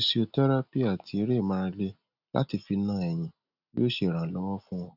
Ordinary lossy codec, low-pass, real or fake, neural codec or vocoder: none; 5.4 kHz; real; none